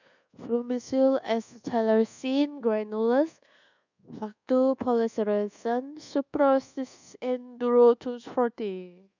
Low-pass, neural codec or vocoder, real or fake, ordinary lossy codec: 7.2 kHz; codec, 24 kHz, 1.2 kbps, DualCodec; fake; none